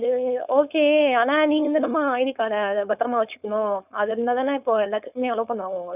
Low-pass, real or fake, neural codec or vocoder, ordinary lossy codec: 3.6 kHz; fake; codec, 16 kHz, 4.8 kbps, FACodec; none